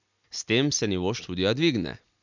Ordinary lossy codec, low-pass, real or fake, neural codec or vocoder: none; 7.2 kHz; real; none